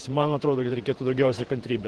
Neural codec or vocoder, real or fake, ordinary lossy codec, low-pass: vocoder, 48 kHz, 128 mel bands, Vocos; fake; Opus, 16 kbps; 10.8 kHz